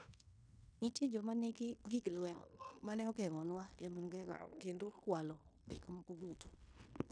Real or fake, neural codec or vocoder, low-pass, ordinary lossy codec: fake; codec, 16 kHz in and 24 kHz out, 0.9 kbps, LongCat-Audio-Codec, fine tuned four codebook decoder; 9.9 kHz; none